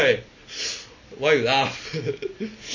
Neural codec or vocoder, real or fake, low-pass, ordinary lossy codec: none; real; 7.2 kHz; MP3, 32 kbps